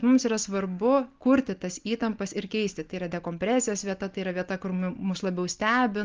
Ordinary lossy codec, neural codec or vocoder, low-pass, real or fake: Opus, 24 kbps; none; 7.2 kHz; real